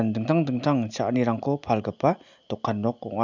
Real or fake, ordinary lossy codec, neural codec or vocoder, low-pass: real; none; none; 7.2 kHz